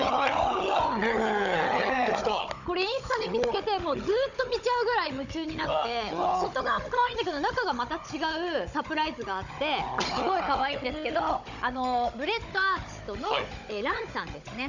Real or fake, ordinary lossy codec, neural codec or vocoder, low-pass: fake; none; codec, 16 kHz, 16 kbps, FunCodec, trained on Chinese and English, 50 frames a second; 7.2 kHz